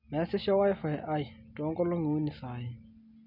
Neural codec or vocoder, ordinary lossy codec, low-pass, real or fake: none; none; 5.4 kHz; real